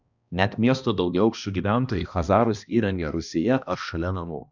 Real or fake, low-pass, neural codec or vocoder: fake; 7.2 kHz; codec, 16 kHz, 1 kbps, X-Codec, HuBERT features, trained on balanced general audio